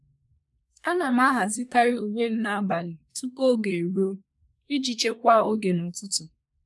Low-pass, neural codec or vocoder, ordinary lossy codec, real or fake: none; codec, 24 kHz, 1 kbps, SNAC; none; fake